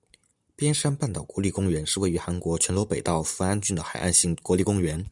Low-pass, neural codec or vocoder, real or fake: 10.8 kHz; none; real